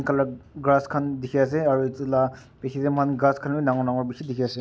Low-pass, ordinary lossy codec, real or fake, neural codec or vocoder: none; none; real; none